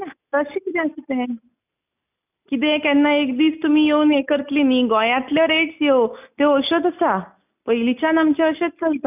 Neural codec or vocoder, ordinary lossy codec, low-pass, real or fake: none; none; 3.6 kHz; real